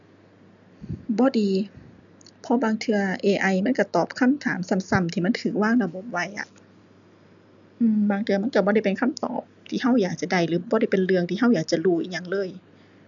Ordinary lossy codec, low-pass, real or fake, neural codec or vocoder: none; 7.2 kHz; real; none